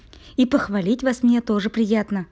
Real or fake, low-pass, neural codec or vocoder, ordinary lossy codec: real; none; none; none